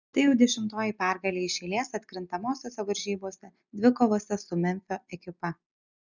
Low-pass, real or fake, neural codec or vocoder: 7.2 kHz; real; none